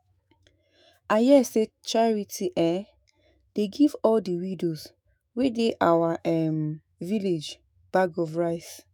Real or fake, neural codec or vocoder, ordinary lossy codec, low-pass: fake; autoencoder, 48 kHz, 128 numbers a frame, DAC-VAE, trained on Japanese speech; none; none